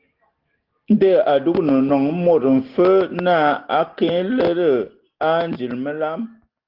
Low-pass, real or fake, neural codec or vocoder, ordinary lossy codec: 5.4 kHz; real; none; Opus, 16 kbps